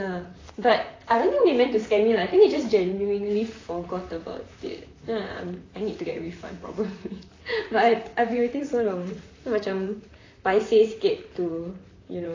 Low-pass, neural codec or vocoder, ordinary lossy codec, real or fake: 7.2 kHz; vocoder, 44.1 kHz, 128 mel bands, Pupu-Vocoder; AAC, 32 kbps; fake